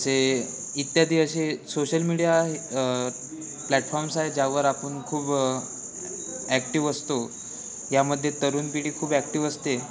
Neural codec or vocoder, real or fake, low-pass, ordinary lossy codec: none; real; none; none